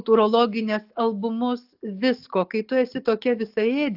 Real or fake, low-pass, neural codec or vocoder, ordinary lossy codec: real; 5.4 kHz; none; AAC, 48 kbps